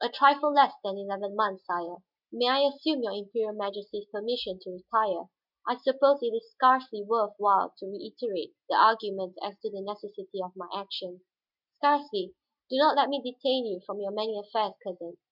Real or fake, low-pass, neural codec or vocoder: real; 5.4 kHz; none